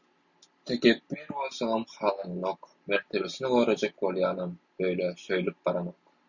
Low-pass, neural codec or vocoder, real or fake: 7.2 kHz; none; real